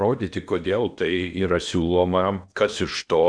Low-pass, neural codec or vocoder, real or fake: 9.9 kHz; codec, 16 kHz in and 24 kHz out, 0.8 kbps, FocalCodec, streaming, 65536 codes; fake